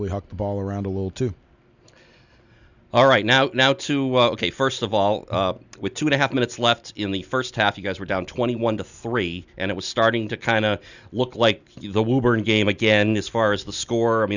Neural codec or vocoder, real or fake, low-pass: none; real; 7.2 kHz